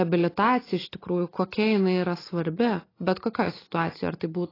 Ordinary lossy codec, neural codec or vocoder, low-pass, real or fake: AAC, 24 kbps; none; 5.4 kHz; real